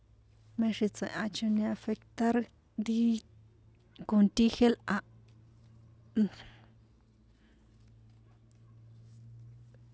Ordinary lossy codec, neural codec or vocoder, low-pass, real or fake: none; none; none; real